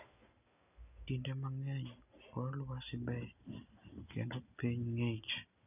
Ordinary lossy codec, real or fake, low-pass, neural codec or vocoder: none; real; 3.6 kHz; none